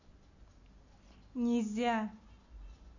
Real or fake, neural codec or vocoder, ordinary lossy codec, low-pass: real; none; none; 7.2 kHz